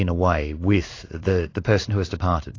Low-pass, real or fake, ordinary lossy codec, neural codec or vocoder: 7.2 kHz; fake; AAC, 32 kbps; codec, 16 kHz in and 24 kHz out, 1 kbps, XY-Tokenizer